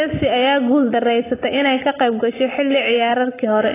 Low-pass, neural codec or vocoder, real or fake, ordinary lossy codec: 3.6 kHz; none; real; AAC, 16 kbps